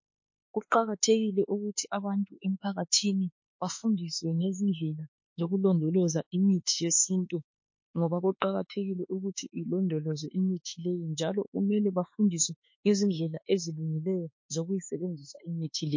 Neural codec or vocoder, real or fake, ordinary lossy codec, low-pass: autoencoder, 48 kHz, 32 numbers a frame, DAC-VAE, trained on Japanese speech; fake; MP3, 32 kbps; 7.2 kHz